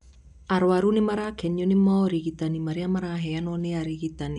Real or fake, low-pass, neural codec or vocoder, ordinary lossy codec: real; 10.8 kHz; none; none